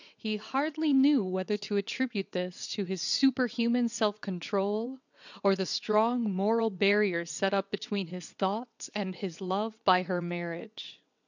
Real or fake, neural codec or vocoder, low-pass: fake; vocoder, 22.05 kHz, 80 mel bands, WaveNeXt; 7.2 kHz